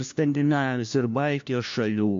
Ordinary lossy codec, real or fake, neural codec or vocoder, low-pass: MP3, 64 kbps; fake; codec, 16 kHz, 1 kbps, FunCodec, trained on LibriTTS, 50 frames a second; 7.2 kHz